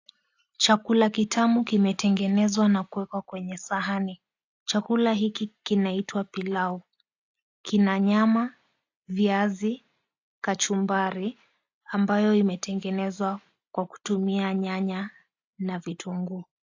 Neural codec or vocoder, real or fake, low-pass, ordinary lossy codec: none; real; 7.2 kHz; AAC, 48 kbps